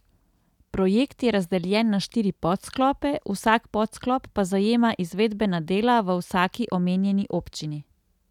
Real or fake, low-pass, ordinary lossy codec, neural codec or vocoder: real; 19.8 kHz; none; none